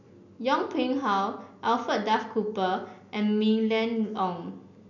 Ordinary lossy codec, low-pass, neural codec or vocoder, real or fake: none; 7.2 kHz; none; real